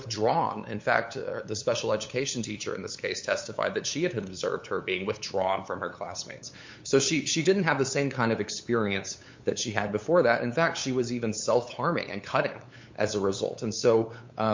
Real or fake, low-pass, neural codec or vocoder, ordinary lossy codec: fake; 7.2 kHz; codec, 16 kHz, 8 kbps, FunCodec, trained on LibriTTS, 25 frames a second; MP3, 48 kbps